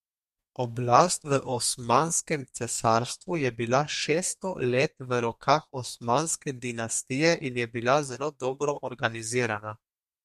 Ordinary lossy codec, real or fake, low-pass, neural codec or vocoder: MP3, 64 kbps; fake; 14.4 kHz; codec, 32 kHz, 1.9 kbps, SNAC